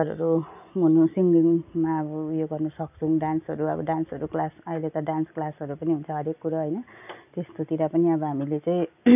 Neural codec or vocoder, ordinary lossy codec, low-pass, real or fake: none; none; 3.6 kHz; real